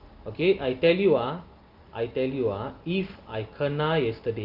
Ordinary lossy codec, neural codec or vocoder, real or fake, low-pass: Opus, 24 kbps; none; real; 5.4 kHz